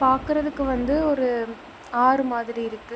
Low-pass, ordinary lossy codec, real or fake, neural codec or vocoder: none; none; real; none